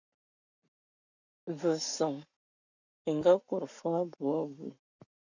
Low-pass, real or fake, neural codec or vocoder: 7.2 kHz; fake; codec, 44.1 kHz, 7.8 kbps, Pupu-Codec